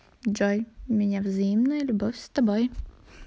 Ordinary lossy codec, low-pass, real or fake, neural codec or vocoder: none; none; real; none